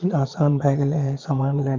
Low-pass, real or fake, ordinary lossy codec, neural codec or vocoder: 7.2 kHz; fake; Opus, 24 kbps; vocoder, 22.05 kHz, 80 mel bands, Vocos